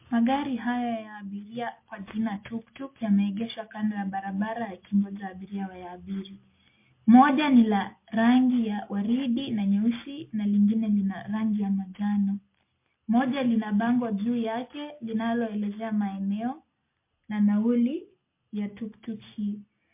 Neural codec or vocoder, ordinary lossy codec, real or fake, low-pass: none; MP3, 24 kbps; real; 3.6 kHz